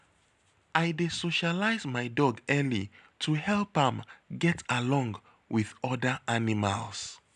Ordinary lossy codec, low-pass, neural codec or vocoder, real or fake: none; 10.8 kHz; none; real